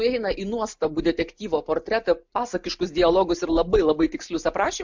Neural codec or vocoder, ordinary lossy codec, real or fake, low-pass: none; MP3, 64 kbps; real; 7.2 kHz